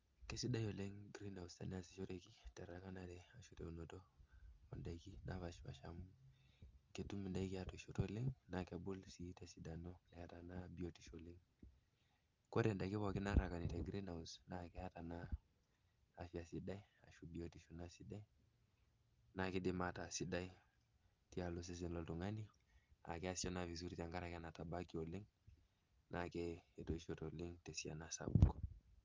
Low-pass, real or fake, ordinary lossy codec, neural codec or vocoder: 7.2 kHz; real; Opus, 32 kbps; none